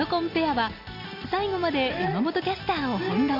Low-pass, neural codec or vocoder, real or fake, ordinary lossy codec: 5.4 kHz; none; real; none